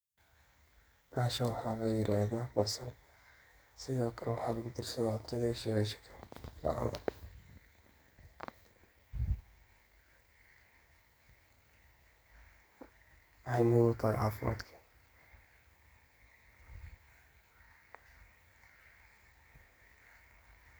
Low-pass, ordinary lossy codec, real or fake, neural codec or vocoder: none; none; fake; codec, 44.1 kHz, 2.6 kbps, SNAC